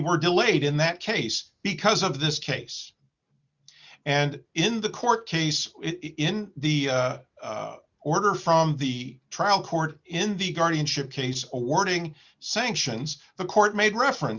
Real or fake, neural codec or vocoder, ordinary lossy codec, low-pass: real; none; Opus, 64 kbps; 7.2 kHz